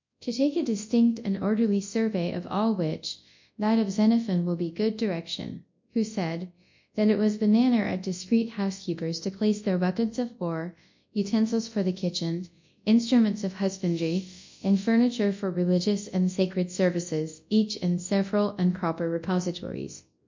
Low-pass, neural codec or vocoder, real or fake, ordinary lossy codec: 7.2 kHz; codec, 24 kHz, 0.9 kbps, WavTokenizer, large speech release; fake; MP3, 64 kbps